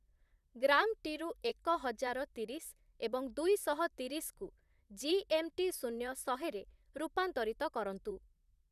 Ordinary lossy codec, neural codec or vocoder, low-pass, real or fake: none; vocoder, 44.1 kHz, 128 mel bands, Pupu-Vocoder; 14.4 kHz; fake